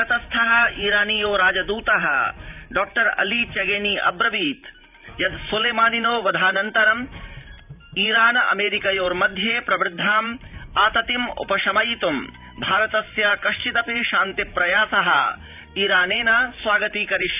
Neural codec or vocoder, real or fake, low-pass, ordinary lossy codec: vocoder, 44.1 kHz, 128 mel bands every 512 samples, BigVGAN v2; fake; 3.6 kHz; none